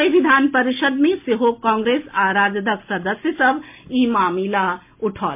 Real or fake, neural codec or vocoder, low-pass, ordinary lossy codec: real; none; 3.6 kHz; MP3, 32 kbps